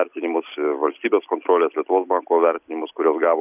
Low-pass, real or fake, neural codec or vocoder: 3.6 kHz; real; none